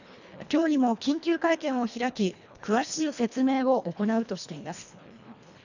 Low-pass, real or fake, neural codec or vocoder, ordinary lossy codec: 7.2 kHz; fake; codec, 24 kHz, 1.5 kbps, HILCodec; none